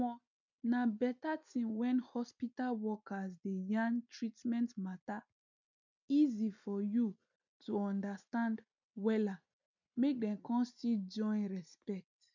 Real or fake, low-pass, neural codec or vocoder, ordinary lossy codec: real; 7.2 kHz; none; none